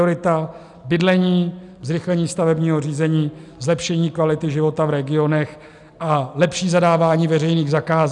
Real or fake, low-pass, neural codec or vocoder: real; 10.8 kHz; none